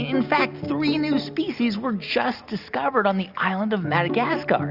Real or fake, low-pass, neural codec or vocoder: real; 5.4 kHz; none